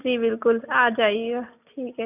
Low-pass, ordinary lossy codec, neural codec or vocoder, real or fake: 3.6 kHz; none; none; real